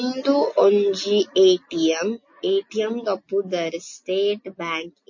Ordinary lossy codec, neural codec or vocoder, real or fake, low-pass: MP3, 32 kbps; none; real; 7.2 kHz